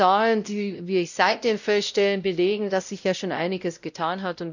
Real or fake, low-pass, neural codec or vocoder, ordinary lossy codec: fake; 7.2 kHz; codec, 16 kHz, 0.5 kbps, X-Codec, WavLM features, trained on Multilingual LibriSpeech; none